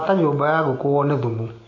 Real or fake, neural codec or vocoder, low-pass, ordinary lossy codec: real; none; 7.2 kHz; AAC, 32 kbps